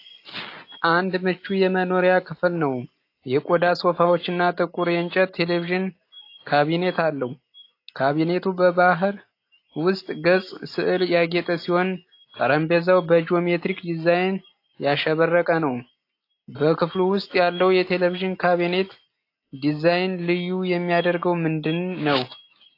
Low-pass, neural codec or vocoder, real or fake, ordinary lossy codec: 5.4 kHz; none; real; AAC, 32 kbps